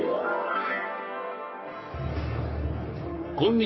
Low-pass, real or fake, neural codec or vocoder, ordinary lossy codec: 7.2 kHz; fake; codec, 44.1 kHz, 3.4 kbps, Pupu-Codec; MP3, 24 kbps